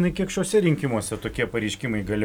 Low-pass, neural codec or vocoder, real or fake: 19.8 kHz; none; real